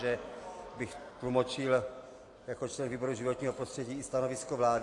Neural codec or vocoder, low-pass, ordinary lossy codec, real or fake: none; 10.8 kHz; AAC, 48 kbps; real